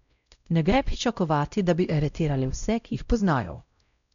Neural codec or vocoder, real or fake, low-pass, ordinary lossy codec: codec, 16 kHz, 0.5 kbps, X-Codec, WavLM features, trained on Multilingual LibriSpeech; fake; 7.2 kHz; none